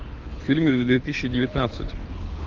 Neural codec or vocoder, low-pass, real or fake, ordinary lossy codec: codec, 24 kHz, 6 kbps, HILCodec; 7.2 kHz; fake; Opus, 32 kbps